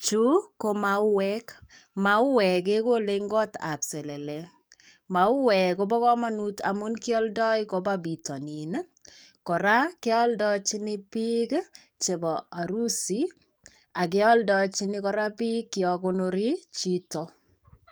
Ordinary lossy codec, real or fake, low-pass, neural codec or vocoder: none; fake; none; codec, 44.1 kHz, 7.8 kbps, DAC